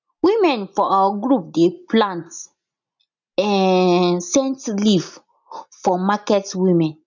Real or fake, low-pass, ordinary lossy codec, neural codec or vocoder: real; 7.2 kHz; none; none